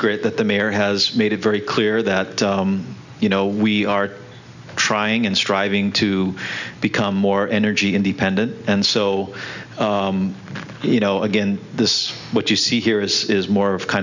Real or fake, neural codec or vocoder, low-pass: real; none; 7.2 kHz